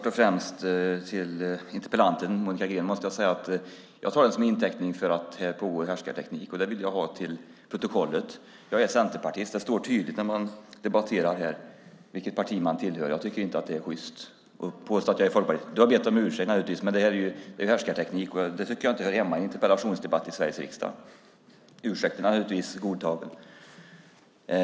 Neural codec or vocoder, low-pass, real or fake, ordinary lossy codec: none; none; real; none